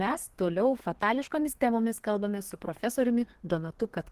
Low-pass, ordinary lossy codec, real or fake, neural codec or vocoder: 14.4 kHz; Opus, 16 kbps; fake; codec, 32 kHz, 1.9 kbps, SNAC